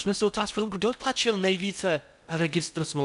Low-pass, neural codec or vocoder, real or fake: 10.8 kHz; codec, 16 kHz in and 24 kHz out, 0.6 kbps, FocalCodec, streaming, 4096 codes; fake